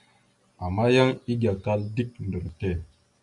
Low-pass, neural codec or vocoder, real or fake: 10.8 kHz; none; real